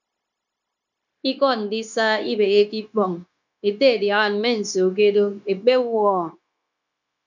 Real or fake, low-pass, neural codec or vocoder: fake; 7.2 kHz; codec, 16 kHz, 0.9 kbps, LongCat-Audio-Codec